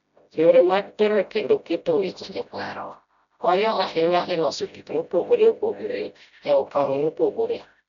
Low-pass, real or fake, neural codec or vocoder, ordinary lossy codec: 7.2 kHz; fake; codec, 16 kHz, 0.5 kbps, FreqCodec, smaller model; none